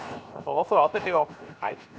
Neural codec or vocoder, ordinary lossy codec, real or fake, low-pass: codec, 16 kHz, 0.7 kbps, FocalCodec; none; fake; none